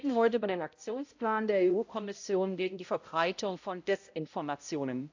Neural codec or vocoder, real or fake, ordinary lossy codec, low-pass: codec, 16 kHz, 0.5 kbps, X-Codec, HuBERT features, trained on balanced general audio; fake; AAC, 48 kbps; 7.2 kHz